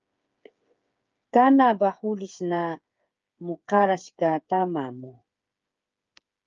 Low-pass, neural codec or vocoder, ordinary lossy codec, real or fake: 7.2 kHz; codec, 16 kHz, 8 kbps, FreqCodec, smaller model; Opus, 24 kbps; fake